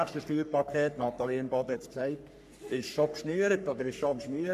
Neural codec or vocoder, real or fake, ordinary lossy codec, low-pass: codec, 44.1 kHz, 3.4 kbps, Pupu-Codec; fake; MP3, 96 kbps; 14.4 kHz